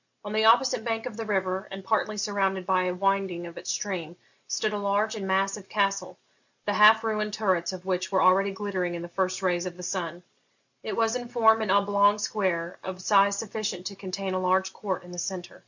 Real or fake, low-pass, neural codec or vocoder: real; 7.2 kHz; none